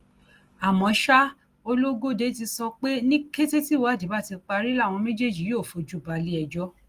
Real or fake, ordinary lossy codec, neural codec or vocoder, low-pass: real; Opus, 24 kbps; none; 14.4 kHz